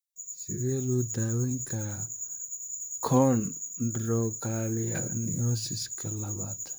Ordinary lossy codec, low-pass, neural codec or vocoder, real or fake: none; none; vocoder, 44.1 kHz, 128 mel bands, Pupu-Vocoder; fake